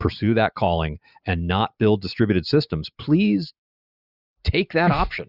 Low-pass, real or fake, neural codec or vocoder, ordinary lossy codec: 5.4 kHz; real; none; Opus, 64 kbps